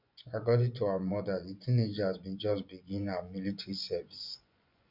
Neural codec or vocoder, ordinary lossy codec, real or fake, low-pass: vocoder, 24 kHz, 100 mel bands, Vocos; none; fake; 5.4 kHz